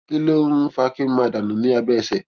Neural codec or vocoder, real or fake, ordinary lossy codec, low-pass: none; real; Opus, 32 kbps; 7.2 kHz